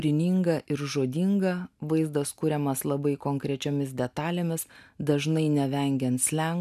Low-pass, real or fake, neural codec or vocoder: 14.4 kHz; real; none